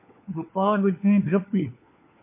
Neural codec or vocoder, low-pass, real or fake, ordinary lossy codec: codec, 16 kHz, 4 kbps, FunCodec, trained on LibriTTS, 50 frames a second; 3.6 kHz; fake; MP3, 24 kbps